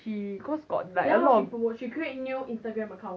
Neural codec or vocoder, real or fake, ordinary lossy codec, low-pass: none; real; none; none